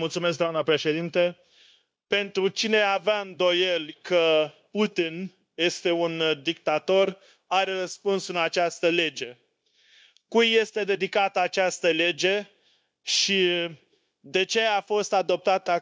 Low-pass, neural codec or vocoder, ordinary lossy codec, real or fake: none; codec, 16 kHz, 0.9 kbps, LongCat-Audio-Codec; none; fake